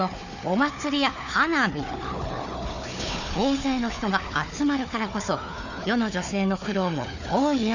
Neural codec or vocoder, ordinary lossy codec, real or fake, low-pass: codec, 16 kHz, 4 kbps, FunCodec, trained on Chinese and English, 50 frames a second; none; fake; 7.2 kHz